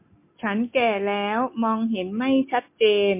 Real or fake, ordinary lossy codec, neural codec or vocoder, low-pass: real; MP3, 24 kbps; none; 3.6 kHz